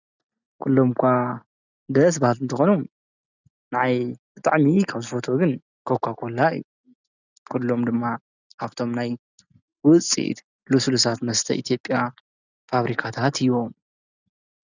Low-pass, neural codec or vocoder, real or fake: 7.2 kHz; none; real